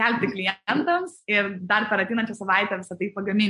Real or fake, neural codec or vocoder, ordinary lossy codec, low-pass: real; none; MP3, 48 kbps; 14.4 kHz